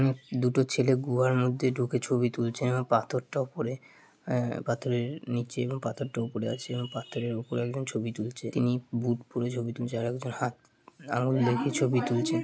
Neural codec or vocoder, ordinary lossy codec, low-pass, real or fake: none; none; none; real